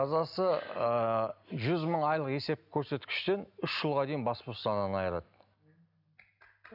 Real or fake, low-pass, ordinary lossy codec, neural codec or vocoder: real; 5.4 kHz; none; none